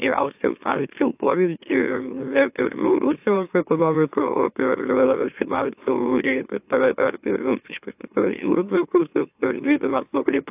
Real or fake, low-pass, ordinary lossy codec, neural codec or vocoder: fake; 3.6 kHz; AAC, 32 kbps; autoencoder, 44.1 kHz, a latent of 192 numbers a frame, MeloTTS